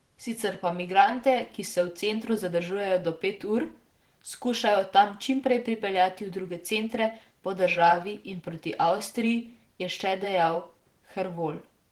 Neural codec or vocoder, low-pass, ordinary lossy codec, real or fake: vocoder, 44.1 kHz, 128 mel bands every 512 samples, BigVGAN v2; 19.8 kHz; Opus, 16 kbps; fake